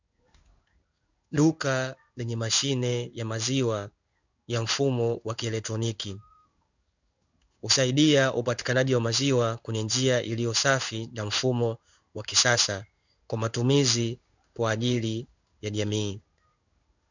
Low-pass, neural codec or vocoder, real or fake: 7.2 kHz; codec, 16 kHz in and 24 kHz out, 1 kbps, XY-Tokenizer; fake